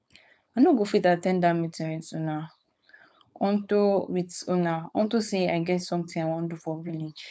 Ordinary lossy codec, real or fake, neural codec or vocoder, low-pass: none; fake; codec, 16 kHz, 4.8 kbps, FACodec; none